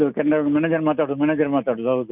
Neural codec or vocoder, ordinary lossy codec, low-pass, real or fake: none; none; 3.6 kHz; real